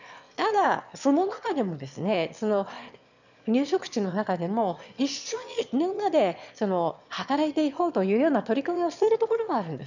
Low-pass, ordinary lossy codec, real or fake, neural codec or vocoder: 7.2 kHz; none; fake; autoencoder, 22.05 kHz, a latent of 192 numbers a frame, VITS, trained on one speaker